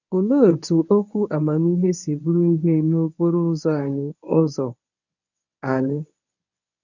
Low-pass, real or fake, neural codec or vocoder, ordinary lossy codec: 7.2 kHz; fake; codec, 24 kHz, 0.9 kbps, WavTokenizer, medium speech release version 1; none